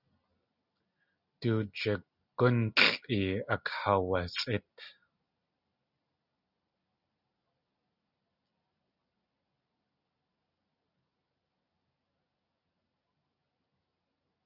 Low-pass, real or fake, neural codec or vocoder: 5.4 kHz; real; none